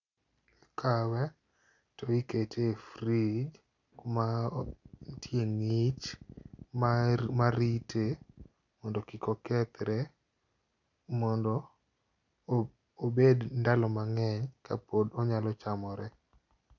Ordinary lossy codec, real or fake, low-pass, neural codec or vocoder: none; real; 7.2 kHz; none